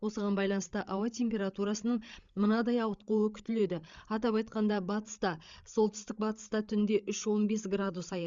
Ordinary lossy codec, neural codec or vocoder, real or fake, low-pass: Opus, 64 kbps; codec, 16 kHz, 8 kbps, FreqCodec, larger model; fake; 7.2 kHz